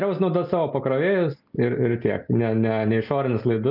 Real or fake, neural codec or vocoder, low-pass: real; none; 5.4 kHz